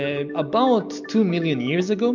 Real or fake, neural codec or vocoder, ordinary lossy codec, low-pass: real; none; MP3, 48 kbps; 7.2 kHz